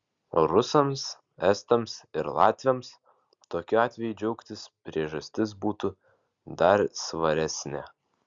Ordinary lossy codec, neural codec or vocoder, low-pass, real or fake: Opus, 64 kbps; none; 7.2 kHz; real